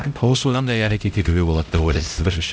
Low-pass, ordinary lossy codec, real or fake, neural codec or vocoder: none; none; fake; codec, 16 kHz, 0.5 kbps, X-Codec, HuBERT features, trained on LibriSpeech